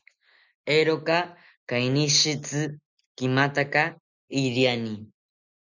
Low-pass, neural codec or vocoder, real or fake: 7.2 kHz; none; real